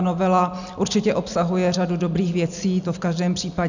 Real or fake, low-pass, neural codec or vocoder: real; 7.2 kHz; none